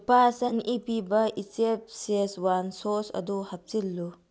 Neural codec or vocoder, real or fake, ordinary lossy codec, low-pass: none; real; none; none